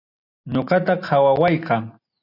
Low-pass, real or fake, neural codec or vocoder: 5.4 kHz; real; none